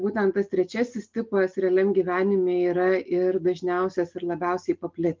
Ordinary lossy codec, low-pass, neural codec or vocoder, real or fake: Opus, 24 kbps; 7.2 kHz; none; real